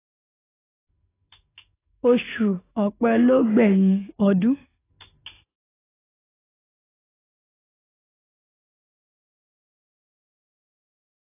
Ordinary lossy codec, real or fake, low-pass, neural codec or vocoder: AAC, 16 kbps; fake; 3.6 kHz; codec, 44.1 kHz, 7.8 kbps, DAC